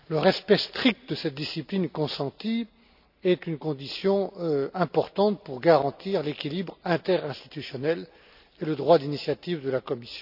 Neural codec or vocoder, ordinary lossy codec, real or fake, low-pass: none; none; real; 5.4 kHz